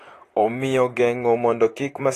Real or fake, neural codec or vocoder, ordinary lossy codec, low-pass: fake; vocoder, 44.1 kHz, 128 mel bands, Pupu-Vocoder; AAC, 48 kbps; 14.4 kHz